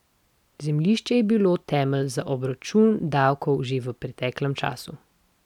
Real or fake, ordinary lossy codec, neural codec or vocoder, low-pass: real; none; none; 19.8 kHz